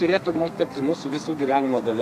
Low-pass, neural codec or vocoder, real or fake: 14.4 kHz; codec, 32 kHz, 1.9 kbps, SNAC; fake